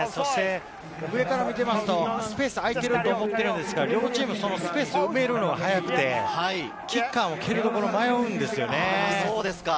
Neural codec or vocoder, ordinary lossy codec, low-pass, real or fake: none; none; none; real